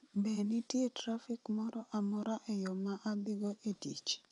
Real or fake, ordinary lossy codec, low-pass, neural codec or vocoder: fake; none; 10.8 kHz; vocoder, 24 kHz, 100 mel bands, Vocos